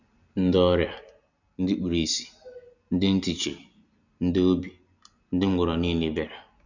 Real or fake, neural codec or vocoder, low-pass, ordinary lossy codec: real; none; 7.2 kHz; none